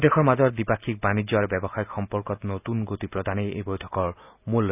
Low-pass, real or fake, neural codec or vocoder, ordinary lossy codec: 3.6 kHz; real; none; none